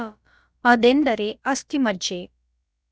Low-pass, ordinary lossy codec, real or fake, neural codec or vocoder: none; none; fake; codec, 16 kHz, about 1 kbps, DyCAST, with the encoder's durations